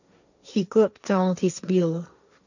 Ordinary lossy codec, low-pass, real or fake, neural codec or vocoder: none; 7.2 kHz; fake; codec, 16 kHz, 1.1 kbps, Voila-Tokenizer